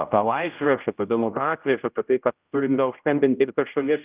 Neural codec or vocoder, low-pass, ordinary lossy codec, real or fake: codec, 16 kHz, 0.5 kbps, X-Codec, HuBERT features, trained on general audio; 3.6 kHz; Opus, 24 kbps; fake